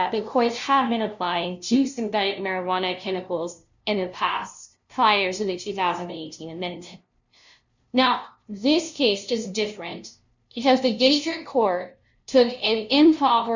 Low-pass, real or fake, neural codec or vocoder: 7.2 kHz; fake; codec, 16 kHz, 0.5 kbps, FunCodec, trained on LibriTTS, 25 frames a second